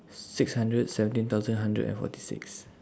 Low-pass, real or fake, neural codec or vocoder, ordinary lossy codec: none; real; none; none